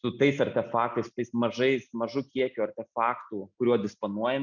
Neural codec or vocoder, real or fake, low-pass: none; real; 7.2 kHz